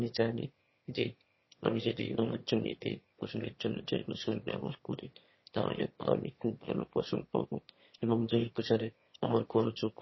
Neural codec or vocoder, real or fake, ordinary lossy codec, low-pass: autoencoder, 22.05 kHz, a latent of 192 numbers a frame, VITS, trained on one speaker; fake; MP3, 24 kbps; 7.2 kHz